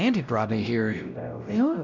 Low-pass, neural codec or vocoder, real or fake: 7.2 kHz; codec, 16 kHz, 0.5 kbps, X-Codec, WavLM features, trained on Multilingual LibriSpeech; fake